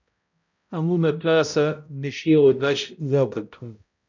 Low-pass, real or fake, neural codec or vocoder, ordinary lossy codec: 7.2 kHz; fake; codec, 16 kHz, 0.5 kbps, X-Codec, HuBERT features, trained on balanced general audio; MP3, 64 kbps